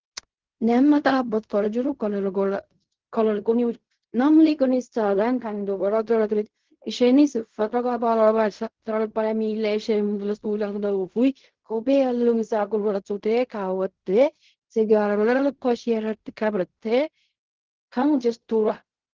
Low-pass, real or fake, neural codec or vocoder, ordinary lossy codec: 7.2 kHz; fake; codec, 16 kHz in and 24 kHz out, 0.4 kbps, LongCat-Audio-Codec, fine tuned four codebook decoder; Opus, 16 kbps